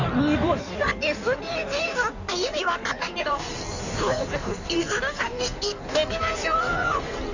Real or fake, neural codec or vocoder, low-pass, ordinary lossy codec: fake; codec, 16 kHz in and 24 kHz out, 1.1 kbps, FireRedTTS-2 codec; 7.2 kHz; none